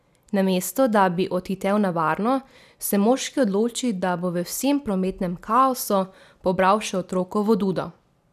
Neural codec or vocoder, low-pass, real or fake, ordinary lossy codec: none; 14.4 kHz; real; none